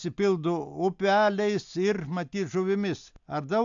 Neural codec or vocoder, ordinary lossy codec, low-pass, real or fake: none; AAC, 64 kbps; 7.2 kHz; real